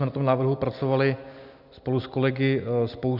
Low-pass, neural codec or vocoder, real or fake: 5.4 kHz; none; real